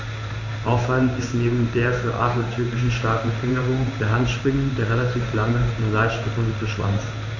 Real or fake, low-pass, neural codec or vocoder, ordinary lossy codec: fake; 7.2 kHz; codec, 16 kHz in and 24 kHz out, 1 kbps, XY-Tokenizer; none